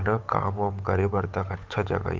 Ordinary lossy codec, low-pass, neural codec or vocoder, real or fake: Opus, 32 kbps; 7.2 kHz; codec, 16 kHz, 8 kbps, FunCodec, trained on Chinese and English, 25 frames a second; fake